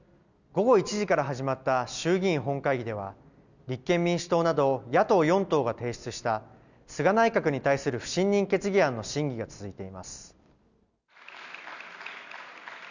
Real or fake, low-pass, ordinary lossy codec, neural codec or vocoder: real; 7.2 kHz; none; none